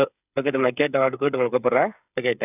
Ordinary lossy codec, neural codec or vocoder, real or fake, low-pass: none; codec, 16 kHz, 8 kbps, FreqCodec, smaller model; fake; 3.6 kHz